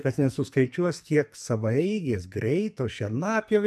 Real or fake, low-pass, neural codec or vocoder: fake; 14.4 kHz; codec, 44.1 kHz, 2.6 kbps, SNAC